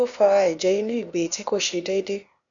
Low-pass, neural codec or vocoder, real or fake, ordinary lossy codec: 7.2 kHz; codec, 16 kHz, 0.8 kbps, ZipCodec; fake; none